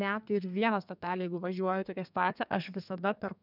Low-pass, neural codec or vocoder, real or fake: 5.4 kHz; codec, 32 kHz, 1.9 kbps, SNAC; fake